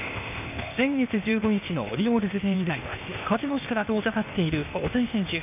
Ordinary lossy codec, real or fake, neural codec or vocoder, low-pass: none; fake; codec, 16 kHz, 0.8 kbps, ZipCodec; 3.6 kHz